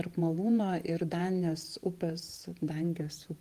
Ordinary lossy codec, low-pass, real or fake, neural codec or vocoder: Opus, 24 kbps; 14.4 kHz; fake; vocoder, 48 kHz, 128 mel bands, Vocos